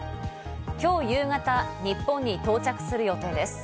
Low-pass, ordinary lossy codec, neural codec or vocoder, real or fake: none; none; none; real